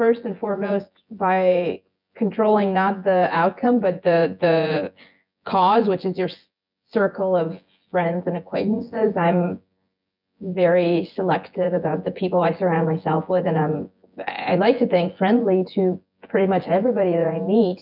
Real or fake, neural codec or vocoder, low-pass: fake; vocoder, 24 kHz, 100 mel bands, Vocos; 5.4 kHz